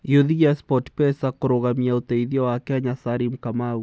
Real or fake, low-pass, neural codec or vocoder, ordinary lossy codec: real; none; none; none